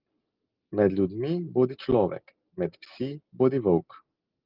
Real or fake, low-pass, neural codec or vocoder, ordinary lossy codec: real; 5.4 kHz; none; Opus, 32 kbps